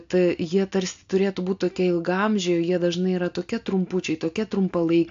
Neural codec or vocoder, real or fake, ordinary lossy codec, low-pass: none; real; AAC, 64 kbps; 7.2 kHz